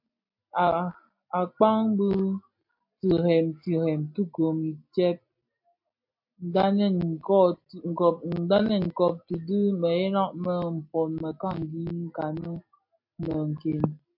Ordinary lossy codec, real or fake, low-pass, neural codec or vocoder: MP3, 32 kbps; real; 5.4 kHz; none